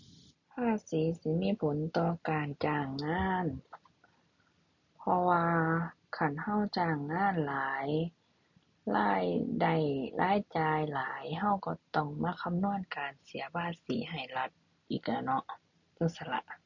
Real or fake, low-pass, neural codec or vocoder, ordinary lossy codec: real; 7.2 kHz; none; none